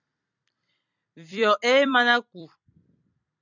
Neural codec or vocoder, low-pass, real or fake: vocoder, 44.1 kHz, 128 mel bands every 256 samples, BigVGAN v2; 7.2 kHz; fake